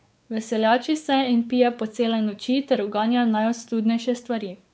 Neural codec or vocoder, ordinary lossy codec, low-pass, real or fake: codec, 16 kHz, 4 kbps, X-Codec, WavLM features, trained on Multilingual LibriSpeech; none; none; fake